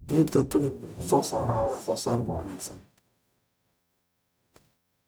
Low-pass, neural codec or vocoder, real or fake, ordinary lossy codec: none; codec, 44.1 kHz, 0.9 kbps, DAC; fake; none